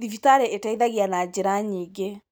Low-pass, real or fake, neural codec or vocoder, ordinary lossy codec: none; real; none; none